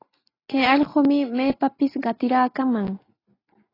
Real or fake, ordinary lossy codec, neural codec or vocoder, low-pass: real; AAC, 24 kbps; none; 5.4 kHz